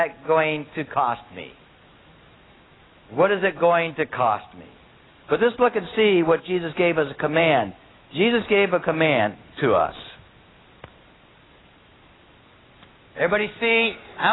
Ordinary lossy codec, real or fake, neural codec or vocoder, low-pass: AAC, 16 kbps; real; none; 7.2 kHz